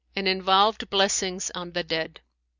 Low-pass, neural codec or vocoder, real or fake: 7.2 kHz; none; real